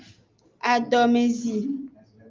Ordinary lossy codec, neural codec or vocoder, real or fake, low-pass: Opus, 32 kbps; none; real; 7.2 kHz